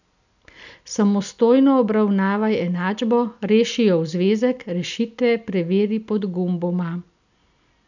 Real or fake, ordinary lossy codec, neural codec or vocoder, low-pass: real; none; none; 7.2 kHz